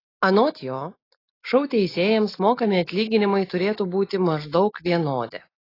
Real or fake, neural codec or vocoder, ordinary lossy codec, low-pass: real; none; AAC, 24 kbps; 5.4 kHz